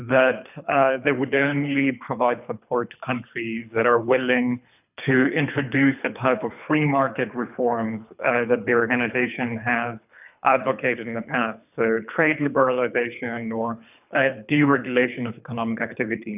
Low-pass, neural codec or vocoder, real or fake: 3.6 kHz; codec, 24 kHz, 3 kbps, HILCodec; fake